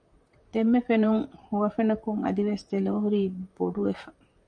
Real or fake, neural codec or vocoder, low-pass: fake; vocoder, 44.1 kHz, 128 mel bands, Pupu-Vocoder; 9.9 kHz